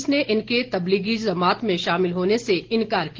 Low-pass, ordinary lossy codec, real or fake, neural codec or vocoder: 7.2 kHz; Opus, 16 kbps; real; none